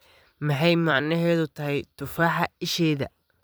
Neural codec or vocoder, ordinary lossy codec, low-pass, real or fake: vocoder, 44.1 kHz, 128 mel bands every 256 samples, BigVGAN v2; none; none; fake